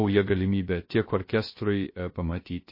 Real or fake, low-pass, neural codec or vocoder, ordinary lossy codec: fake; 5.4 kHz; codec, 16 kHz, 0.3 kbps, FocalCodec; MP3, 24 kbps